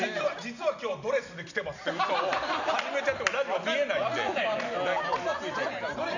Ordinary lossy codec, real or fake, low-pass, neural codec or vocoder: none; real; 7.2 kHz; none